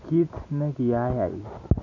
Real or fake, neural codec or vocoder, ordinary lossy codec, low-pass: real; none; none; 7.2 kHz